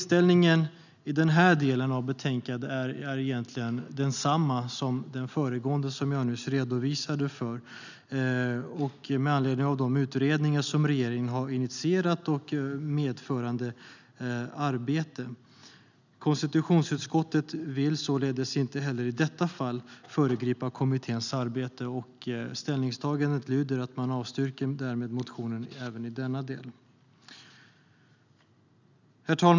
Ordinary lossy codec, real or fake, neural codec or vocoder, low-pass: none; real; none; 7.2 kHz